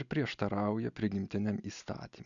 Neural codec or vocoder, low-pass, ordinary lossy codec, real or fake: none; 7.2 kHz; MP3, 96 kbps; real